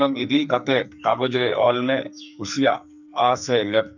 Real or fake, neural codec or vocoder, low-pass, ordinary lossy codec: fake; codec, 44.1 kHz, 2.6 kbps, SNAC; 7.2 kHz; none